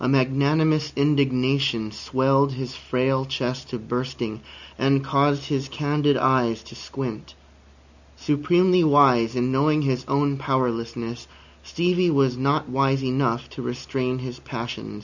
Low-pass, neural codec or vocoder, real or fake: 7.2 kHz; none; real